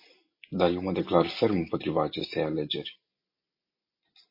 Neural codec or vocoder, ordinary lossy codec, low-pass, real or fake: none; MP3, 24 kbps; 5.4 kHz; real